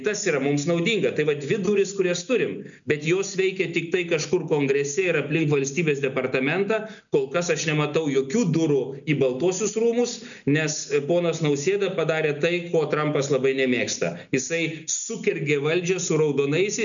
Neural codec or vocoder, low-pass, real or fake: none; 7.2 kHz; real